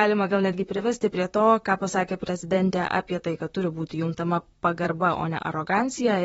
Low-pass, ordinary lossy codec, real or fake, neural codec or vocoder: 19.8 kHz; AAC, 24 kbps; fake; vocoder, 44.1 kHz, 128 mel bands, Pupu-Vocoder